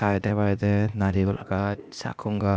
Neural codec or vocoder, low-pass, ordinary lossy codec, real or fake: codec, 16 kHz, 0.8 kbps, ZipCodec; none; none; fake